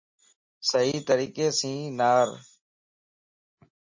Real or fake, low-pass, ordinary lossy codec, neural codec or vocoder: real; 7.2 kHz; MP3, 32 kbps; none